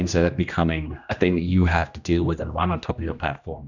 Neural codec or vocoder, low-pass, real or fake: codec, 16 kHz, 1 kbps, X-Codec, HuBERT features, trained on general audio; 7.2 kHz; fake